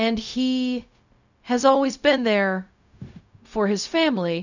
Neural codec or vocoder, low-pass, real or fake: codec, 16 kHz, 0.4 kbps, LongCat-Audio-Codec; 7.2 kHz; fake